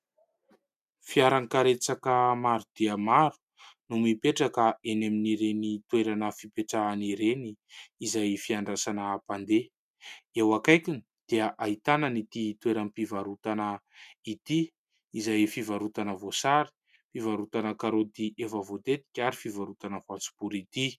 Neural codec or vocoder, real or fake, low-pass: none; real; 14.4 kHz